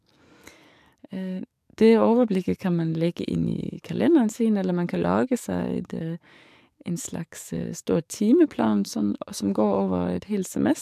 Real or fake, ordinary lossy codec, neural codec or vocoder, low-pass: fake; MP3, 96 kbps; codec, 44.1 kHz, 7.8 kbps, DAC; 14.4 kHz